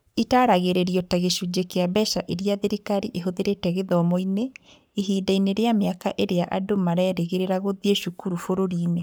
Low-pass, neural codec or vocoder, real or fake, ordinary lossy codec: none; codec, 44.1 kHz, 7.8 kbps, Pupu-Codec; fake; none